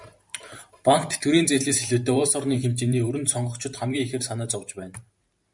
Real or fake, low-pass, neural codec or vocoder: fake; 10.8 kHz; vocoder, 44.1 kHz, 128 mel bands every 512 samples, BigVGAN v2